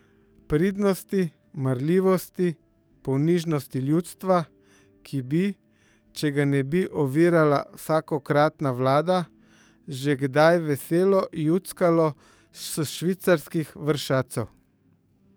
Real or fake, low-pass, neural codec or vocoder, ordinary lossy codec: fake; none; codec, 44.1 kHz, 7.8 kbps, DAC; none